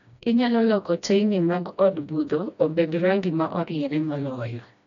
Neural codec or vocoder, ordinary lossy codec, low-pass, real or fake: codec, 16 kHz, 1 kbps, FreqCodec, smaller model; none; 7.2 kHz; fake